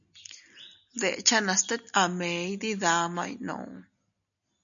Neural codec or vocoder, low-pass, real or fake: none; 7.2 kHz; real